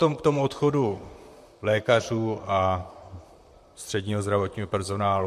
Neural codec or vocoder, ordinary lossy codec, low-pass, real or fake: vocoder, 44.1 kHz, 128 mel bands, Pupu-Vocoder; MP3, 64 kbps; 14.4 kHz; fake